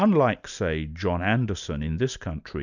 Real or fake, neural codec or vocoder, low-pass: real; none; 7.2 kHz